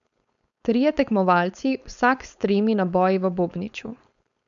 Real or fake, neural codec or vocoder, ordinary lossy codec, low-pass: fake; codec, 16 kHz, 4.8 kbps, FACodec; none; 7.2 kHz